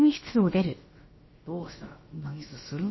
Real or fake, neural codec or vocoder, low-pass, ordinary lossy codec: fake; codec, 16 kHz, about 1 kbps, DyCAST, with the encoder's durations; 7.2 kHz; MP3, 24 kbps